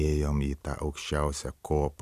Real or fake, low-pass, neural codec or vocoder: fake; 14.4 kHz; vocoder, 44.1 kHz, 128 mel bands every 256 samples, BigVGAN v2